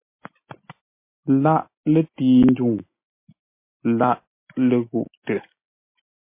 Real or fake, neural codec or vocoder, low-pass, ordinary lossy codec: real; none; 3.6 kHz; MP3, 24 kbps